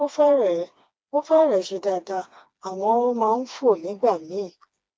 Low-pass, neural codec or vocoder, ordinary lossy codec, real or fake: none; codec, 16 kHz, 2 kbps, FreqCodec, smaller model; none; fake